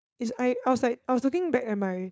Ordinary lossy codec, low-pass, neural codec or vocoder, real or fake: none; none; codec, 16 kHz, 4.8 kbps, FACodec; fake